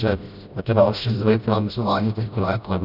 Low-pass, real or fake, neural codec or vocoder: 5.4 kHz; fake; codec, 16 kHz, 0.5 kbps, FreqCodec, smaller model